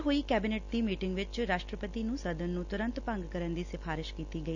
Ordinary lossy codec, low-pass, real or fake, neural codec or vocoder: none; 7.2 kHz; real; none